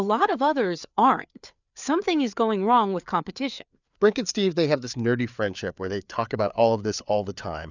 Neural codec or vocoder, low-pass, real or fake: codec, 16 kHz, 4 kbps, FreqCodec, larger model; 7.2 kHz; fake